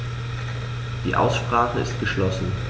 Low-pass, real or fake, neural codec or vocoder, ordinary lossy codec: none; real; none; none